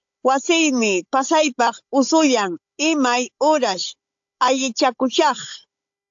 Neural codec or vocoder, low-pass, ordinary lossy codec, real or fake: codec, 16 kHz, 16 kbps, FunCodec, trained on Chinese and English, 50 frames a second; 7.2 kHz; AAC, 64 kbps; fake